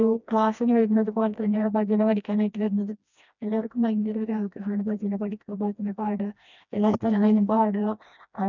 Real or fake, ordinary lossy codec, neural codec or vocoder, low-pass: fake; none; codec, 16 kHz, 1 kbps, FreqCodec, smaller model; 7.2 kHz